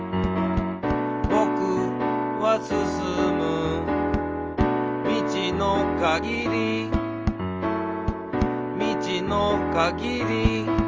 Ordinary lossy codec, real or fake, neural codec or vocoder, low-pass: Opus, 24 kbps; real; none; 7.2 kHz